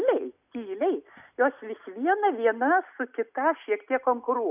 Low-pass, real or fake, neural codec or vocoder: 3.6 kHz; real; none